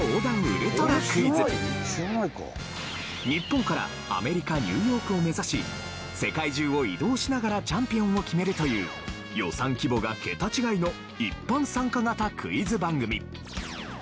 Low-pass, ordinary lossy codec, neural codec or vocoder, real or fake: none; none; none; real